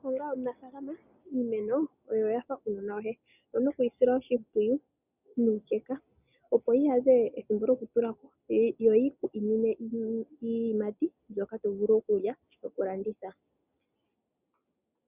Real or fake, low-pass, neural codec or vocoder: real; 3.6 kHz; none